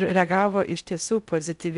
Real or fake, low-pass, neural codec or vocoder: fake; 10.8 kHz; codec, 16 kHz in and 24 kHz out, 0.6 kbps, FocalCodec, streaming, 4096 codes